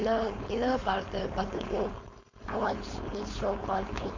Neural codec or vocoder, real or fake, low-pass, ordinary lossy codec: codec, 16 kHz, 4.8 kbps, FACodec; fake; 7.2 kHz; MP3, 48 kbps